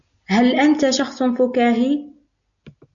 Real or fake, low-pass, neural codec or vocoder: real; 7.2 kHz; none